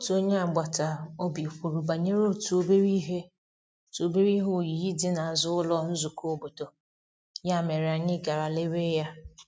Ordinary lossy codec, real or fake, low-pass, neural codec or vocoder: none; real; none; none